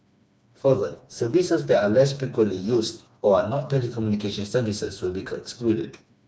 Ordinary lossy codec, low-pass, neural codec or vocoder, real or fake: none; none; codec, 16 kHz, 2 kbps, FreqCodec, smaller model; fake